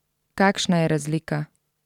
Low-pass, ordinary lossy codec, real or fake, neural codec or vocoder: 19.8 kHz; none; fake; vocoder, 44.1 kHz, 128 mel bands every 512 samples, BigVGAN v2